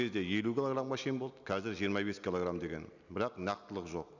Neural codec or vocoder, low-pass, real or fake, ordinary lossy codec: none; 7.2 kHz; real; none